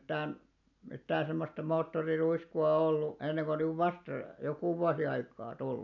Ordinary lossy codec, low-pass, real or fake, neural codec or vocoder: none; 7.2 kHz; real; none